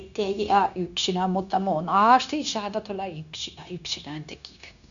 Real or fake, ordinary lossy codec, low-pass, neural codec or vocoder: fake; none; 7.2 kHz; codec, 16 kHz, 0.9 kbps, LongCat-Audio-Codec